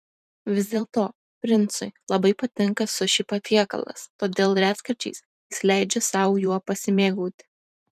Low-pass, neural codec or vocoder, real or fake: 14.4 kHz; vocoder, 44.1 kHz, 128 mel bands every 512 samples, BigVGAN v2; fake